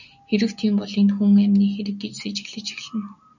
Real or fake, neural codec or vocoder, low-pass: real; none; 7.2 kHz